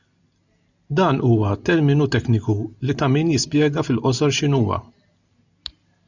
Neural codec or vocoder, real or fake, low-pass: none; real; 7.2 kHz